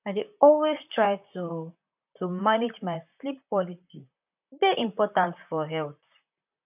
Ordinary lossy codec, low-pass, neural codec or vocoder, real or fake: none; 3.6 kHz; vocoder, 44.1 kHz, 128 mel bands, Pupu-Vocoder; fake